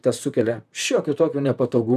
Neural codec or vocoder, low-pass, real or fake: vocoder, 44.1 kHz, 128 mel bands, Pupu-Vocoder; 14.4 kHz; fake